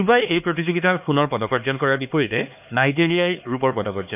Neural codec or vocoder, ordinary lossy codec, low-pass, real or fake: codec, 16 kHz, 2 kbps, X-Codec, HuBERT features, trained on LibriSpeech; none; 3.6 kHz; fake